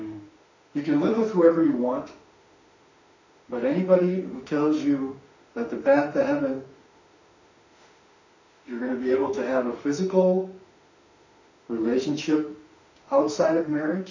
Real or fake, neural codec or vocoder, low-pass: fake; autoencoder, 48 kHz, 32 numbers a frame, DAC-VAE, trained on Japanese speech; 7.2 kHz